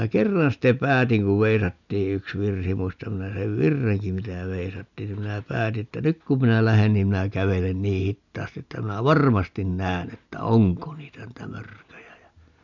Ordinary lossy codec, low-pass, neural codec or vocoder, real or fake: none; 7.2 kHz; none; real